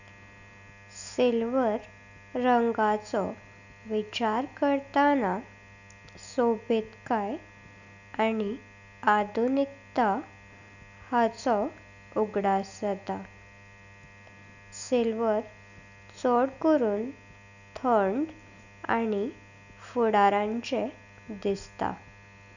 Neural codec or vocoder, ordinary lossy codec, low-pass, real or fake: none; none; 7.2 kHz; real